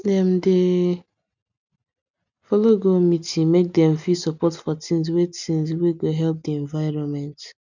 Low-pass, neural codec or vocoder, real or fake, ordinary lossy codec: 7.2 kHz; none; real; none